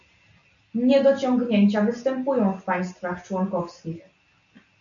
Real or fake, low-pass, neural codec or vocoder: real; 7.2 kHz; none